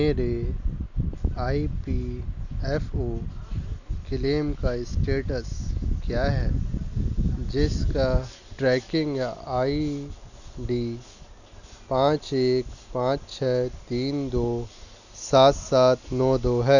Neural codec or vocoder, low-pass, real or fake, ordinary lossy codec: none; 7.2 kHz; real; none